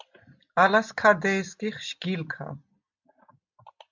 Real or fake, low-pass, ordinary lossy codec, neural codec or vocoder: real; 7.2 kHz; MP3, 64 kbps; none